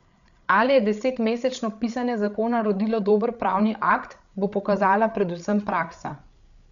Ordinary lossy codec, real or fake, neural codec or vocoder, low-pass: none; fake; codec, 16 kHz, 8 kbps, FreqCodec, larger model; 7.2 kHz